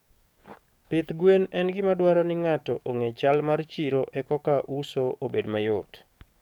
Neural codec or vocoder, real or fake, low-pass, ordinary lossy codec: codec, 44.1 kHz, 7.8 kbps, DAC; fake; 19.8 kHz; none